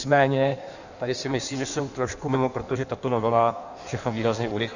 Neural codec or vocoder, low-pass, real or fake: codec, 16 kHz in and 24 kHz out, 1.1 kbps, FireRedTTS-2 codec; 7.2 kHz; fake